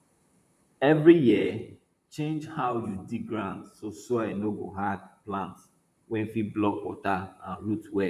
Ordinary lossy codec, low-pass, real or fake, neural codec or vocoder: none; 14.4 kHz; fake; vocoder, 44.1 kHz, 128 mel bands, Pupu-Vocoder